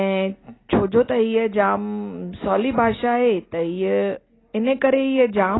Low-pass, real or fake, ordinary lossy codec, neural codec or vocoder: 7.2 kHz; real; AAC, 16 kbps; none